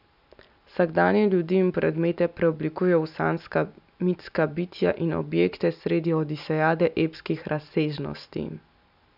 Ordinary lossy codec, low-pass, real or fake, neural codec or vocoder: none; 5.4 kHz; real; none